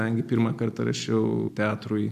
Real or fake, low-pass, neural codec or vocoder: real; 14.4 kHz; none